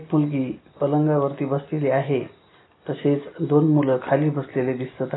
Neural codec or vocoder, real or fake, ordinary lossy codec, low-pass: none; real; AAC, 16 kbps; 7.2 kHz